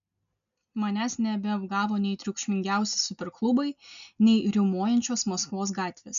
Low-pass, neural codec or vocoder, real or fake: 7.2 kHz; none; real